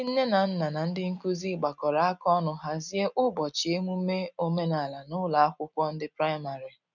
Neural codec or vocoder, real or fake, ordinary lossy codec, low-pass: none; real; none; 7.2 kHz